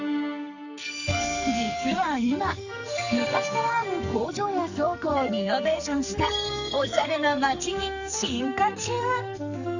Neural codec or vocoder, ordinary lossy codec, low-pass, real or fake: codec, 44.1 kHz, 2.6 kbps, SNAC; none; 7.2 kHz; fake